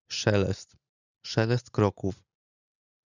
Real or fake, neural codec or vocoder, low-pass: real; none; 7.2 kHz